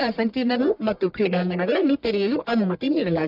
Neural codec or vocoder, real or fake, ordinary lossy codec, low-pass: codec, 44.1 kHz, 1.7 kbps, Pupu-Codec; fake; none; 5.4 kHz